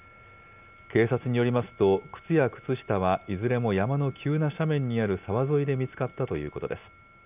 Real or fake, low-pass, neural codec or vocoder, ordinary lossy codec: real; 3.6 kHz; none; none